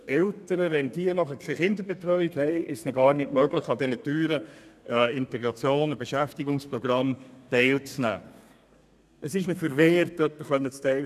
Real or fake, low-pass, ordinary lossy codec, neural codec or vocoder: fake; 14.4 kHz; none; codec, 32 kHz, 1.9 kbps, SNAC